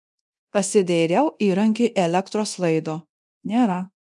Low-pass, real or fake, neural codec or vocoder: 10.8 kHz; fake; codec, 24 kHz, 0.9 kbps, DualCodec